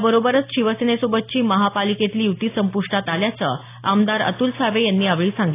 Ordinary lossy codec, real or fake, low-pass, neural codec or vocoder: AAC, 24 kbps; real; 3.6 kHz; none